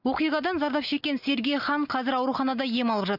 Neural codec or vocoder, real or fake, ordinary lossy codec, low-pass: none; real; none; 5.4 kHz